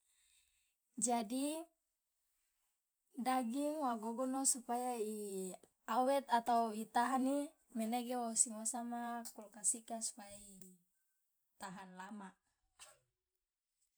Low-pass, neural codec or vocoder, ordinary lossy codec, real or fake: none; vocoder, 44.1 kHz, 128 mel bands every 512 samples, BigVGAN v2; none; fake